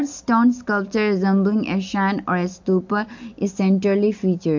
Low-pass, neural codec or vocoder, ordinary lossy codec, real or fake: 7.2 kHz; none; MP3, 48 kbps; real